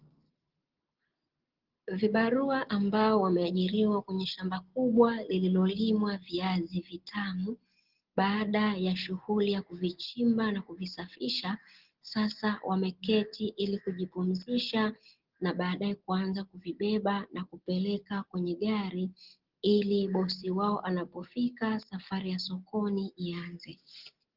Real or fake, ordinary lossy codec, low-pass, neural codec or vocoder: real; Opus, 16 kbps; 5.4 kHz; none